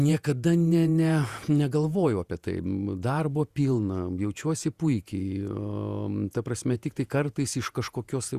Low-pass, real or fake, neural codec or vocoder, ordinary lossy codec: 14.4 kHz; fake; vocoder, 48 kHz, 128 mel bands, Vocos; Opus, 64 kbps